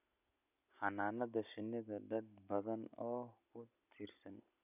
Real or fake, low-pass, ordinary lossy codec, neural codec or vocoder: real; 3.6 kHz; none; none